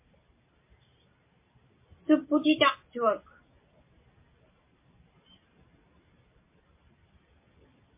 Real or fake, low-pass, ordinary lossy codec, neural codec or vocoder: real; 3.6 kHz; MP3, 24 kbps; none